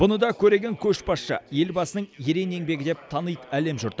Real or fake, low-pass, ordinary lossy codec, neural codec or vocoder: real; none; none; none